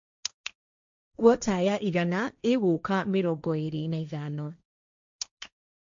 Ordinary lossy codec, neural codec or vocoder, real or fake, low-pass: MP3, 64 kbps; codec, 16 kHz, 1.1 kbps, Voila-Tokenizer; fake; 7.2 kHz